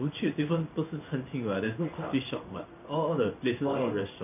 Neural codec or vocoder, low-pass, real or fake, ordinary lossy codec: codec, 16 kHz in and 24 kHz out, 1 kbps, XY-Tokenizer; 3.6 kHz; fake; none